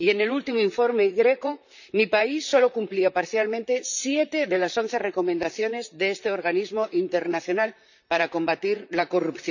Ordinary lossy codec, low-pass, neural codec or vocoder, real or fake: none; 7.2 kHz; vocoder, 44.1 kHz, 128 mel bands, Pupu-Vocoder; fake